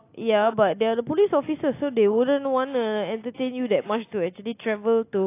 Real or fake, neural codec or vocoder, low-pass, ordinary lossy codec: real; none; 3.6 kHz; AAC, 24 kbps